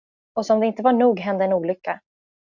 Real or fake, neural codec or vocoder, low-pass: real; none; 7.2 kHz